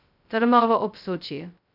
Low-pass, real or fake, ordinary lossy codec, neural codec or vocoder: 5.4 kHz; fake; none; codec, 16 kHz, 0.2 kbps, FocalCodec